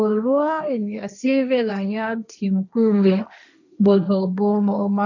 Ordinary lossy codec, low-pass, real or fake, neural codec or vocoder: none; 7.2 kHz; fake; codec, 16 kHz, 1.1 kbps, Voila-Tokenizer